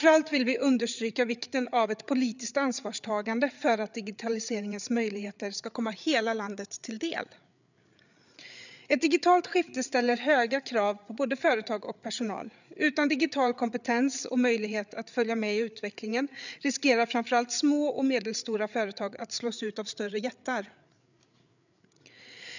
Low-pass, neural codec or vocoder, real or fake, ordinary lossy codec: 7.2 kHz; codec, 16 kHz, 8 kbps, FreqCodec, larger model; fake; none